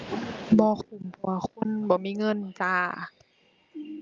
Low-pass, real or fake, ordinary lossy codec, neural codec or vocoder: 7.2 kHz; real; Opus, 24 kbps; none